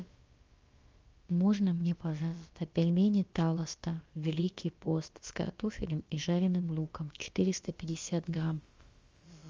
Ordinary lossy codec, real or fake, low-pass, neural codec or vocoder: Opus, 32 kbps; fake; 7.2 kHz; codec, 16 kHz, about 1 kbps, DyCAST, with the encoder's durations